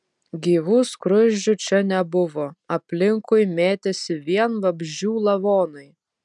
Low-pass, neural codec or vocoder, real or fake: 10.8 kHz; none; real